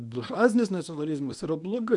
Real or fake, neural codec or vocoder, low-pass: fake; codec, 24 kHz, 0.9 kbps, WavTokenizer, medium speech release version 1; 10.8 kHz